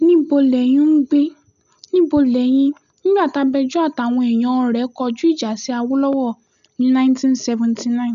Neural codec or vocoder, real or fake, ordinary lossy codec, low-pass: none; real; none; 7.2 kHz